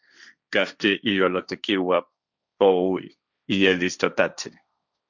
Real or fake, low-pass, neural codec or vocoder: fake; 7.2 kHz; codec, 16 kHz, 1.1 kbps, Voila-Tokenizer